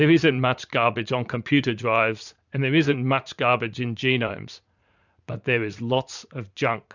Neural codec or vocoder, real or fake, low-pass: vocoder, 44.1 kHz, 80 mel bands, Vocos; fake; 7.2 kHz